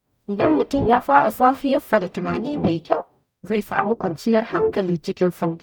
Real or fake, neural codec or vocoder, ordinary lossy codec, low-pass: fake; codec, 44.1 kHz, 0.9 kbps, DAC; none; 19.8 kHz